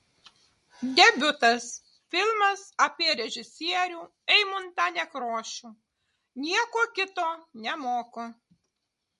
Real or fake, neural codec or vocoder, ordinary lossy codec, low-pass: real; none; MP3, 48 kbps; 14.4 kHz